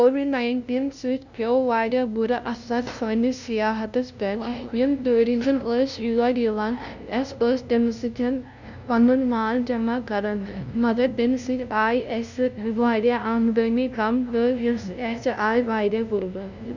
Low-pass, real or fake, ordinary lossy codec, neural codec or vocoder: 7.2 kHz; fake; none; codec, 16 kHz, 0.5 kbps, FunCodec, trained on LibriTTS, 25 frames a second